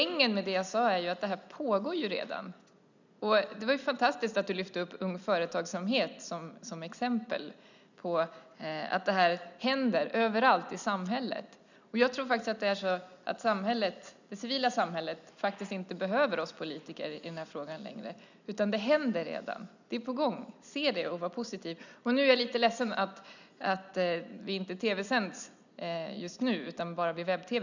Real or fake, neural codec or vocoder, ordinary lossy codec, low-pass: real; none; none; 7.2 kHz